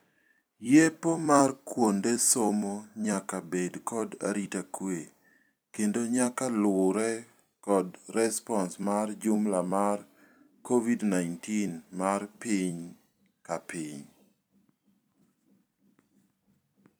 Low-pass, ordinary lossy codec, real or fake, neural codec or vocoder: none; none; fake; vocoder, 44.1 kHz, 128 mel bands every 256 samples, BigVGAN v2